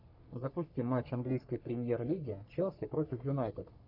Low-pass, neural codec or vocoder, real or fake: 5.4 kHz; codec, 44.1 kHz, 3.4 kbps, Pupu-Codec; fake